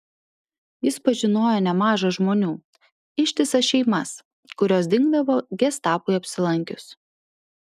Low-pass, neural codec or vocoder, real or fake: 14.4 kHz; none; real